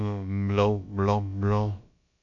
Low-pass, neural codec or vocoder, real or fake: 7.2 kHz; codec, 16 kHz, about 1 kbps, DyCAST, with the encoder's durations; fake